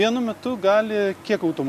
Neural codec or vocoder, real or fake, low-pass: none; real; 14.4 kHz